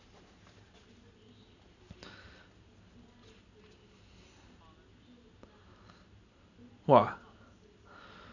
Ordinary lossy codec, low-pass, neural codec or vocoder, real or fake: none; 7.2 kHz; none; real